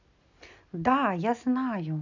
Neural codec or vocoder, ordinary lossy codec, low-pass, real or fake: none; none; 7.2 kHz; real